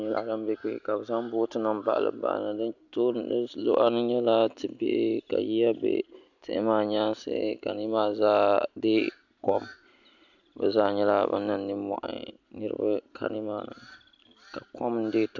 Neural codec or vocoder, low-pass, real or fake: none; 7.2 kHz; real